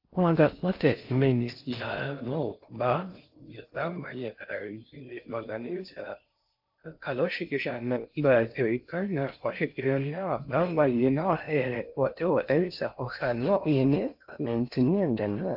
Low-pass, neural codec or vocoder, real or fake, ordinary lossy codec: 5.4 kHz; codec, 16 kHz in and 24 kHz out, 0.6 kbps, FocalCodec, streaming, 4096 codes; fake; AAC, 48 kbps